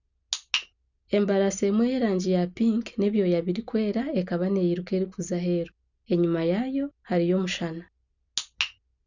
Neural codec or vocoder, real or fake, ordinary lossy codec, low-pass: none; real; none; 7.2 kHz